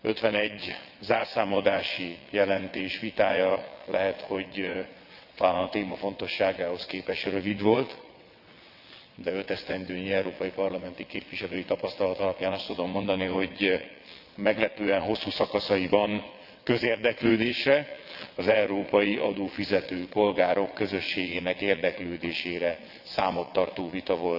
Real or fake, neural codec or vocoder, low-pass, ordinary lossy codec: fake; vocoder, 22.05 kHz, 80 mel bands, WaveNeXt; 5.4 kHz; none